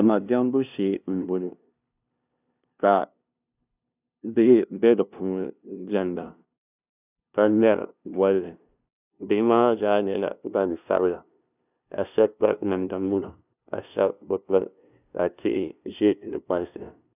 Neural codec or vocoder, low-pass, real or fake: codec, 16 kHz, 0.5 kbps, FunCodec, trained on LibriTTS, 25 frames a second; 3.6 kHz; fake